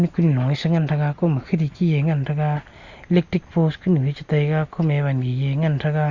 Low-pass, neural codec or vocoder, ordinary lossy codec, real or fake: 7.2 kHz; none; Opus, 64 kbps; real